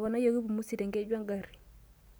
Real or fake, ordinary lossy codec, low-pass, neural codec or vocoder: real; none; none; none